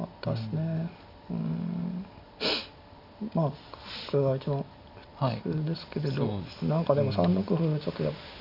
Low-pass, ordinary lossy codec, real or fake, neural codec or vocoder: 5.4 kHz; none; real; none